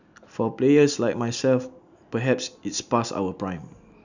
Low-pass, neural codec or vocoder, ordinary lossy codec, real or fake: 7.2 kHz; none; none; real